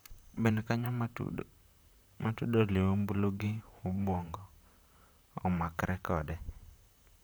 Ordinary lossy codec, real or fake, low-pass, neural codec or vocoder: none; fake; none; vocoder, 44.1 kHz, 128 mel bands, Pupu-Vocoder